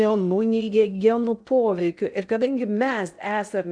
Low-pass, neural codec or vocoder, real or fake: 9.9 kHz; codec, 16 kHz in and 24 kHz out, 0.6 kbps, FocalCodec, streaming, 2048 codes; fake